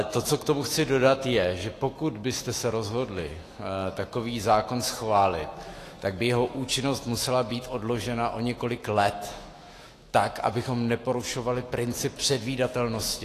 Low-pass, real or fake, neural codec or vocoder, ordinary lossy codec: 14.4 kHz; fake; autoencoder, 48 kHz, 128 numbers a frame, DAC-VAE, trained on Japanese speech; AAC, 48 kbps